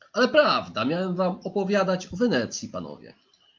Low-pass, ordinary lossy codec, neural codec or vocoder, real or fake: 7.2 kHz; Opus, 24 kbps; none; real